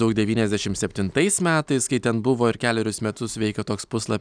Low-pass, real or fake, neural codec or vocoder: 9.9 kHz; real; none